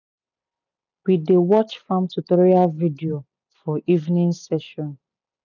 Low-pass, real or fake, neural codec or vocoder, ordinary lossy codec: 7.2 kHz; real; none; none